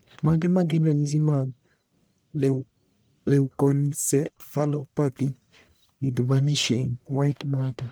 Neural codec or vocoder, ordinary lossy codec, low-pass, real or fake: codec, 44.1 kHz, 1.7 kbps, Pupu-Codec; none; none; fake